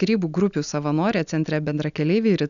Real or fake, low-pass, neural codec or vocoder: real; 7.2 kHz; none